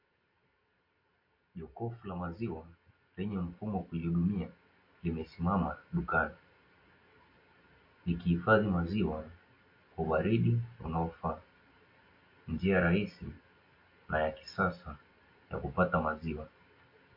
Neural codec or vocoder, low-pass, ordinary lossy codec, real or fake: none; 5.4 kHz; MP3, 32 kbps; real